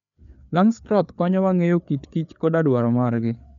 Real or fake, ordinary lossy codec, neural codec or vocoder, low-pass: fake; none; codec, 16 kHz, 4 kbps, FreqCodec, larger model; 7.2 kHz